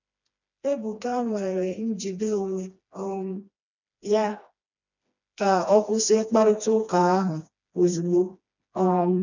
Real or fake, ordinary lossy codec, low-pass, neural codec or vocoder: fake; none; 7.2 kHz; codec, 16 kHz, 2 kbps, FreqCodec, smaller model